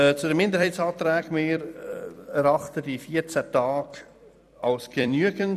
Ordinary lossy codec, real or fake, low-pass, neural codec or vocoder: none; fake; 14.4 kHz; vocoder, 44.1 kHz, 128 mel bands every 256 samples, BigVGAN v2